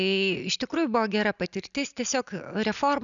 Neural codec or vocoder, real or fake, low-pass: none; real; 7.2 kHz